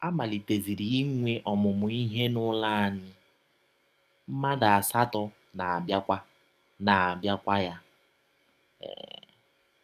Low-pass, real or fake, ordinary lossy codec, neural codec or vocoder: 14.4 kHz; fake; none; codec, 44.1 kHz, 7.8 kbps, DAC